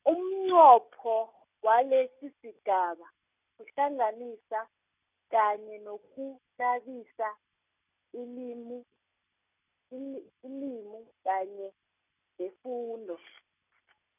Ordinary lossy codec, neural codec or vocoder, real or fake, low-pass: none; none; real; 3.6 kHz